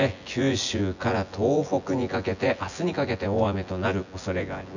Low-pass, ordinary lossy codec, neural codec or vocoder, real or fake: 7.2 kHz; none; vocoder, 24 kHz, 100 mel bands, Vocos; fake